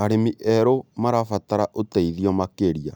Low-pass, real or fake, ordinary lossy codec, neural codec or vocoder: none; real; none; none